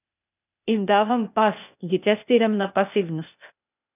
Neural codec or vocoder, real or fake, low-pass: codec, 16 kHz, 0.8 kbps, ZipCodec; fake; 3.6 kHz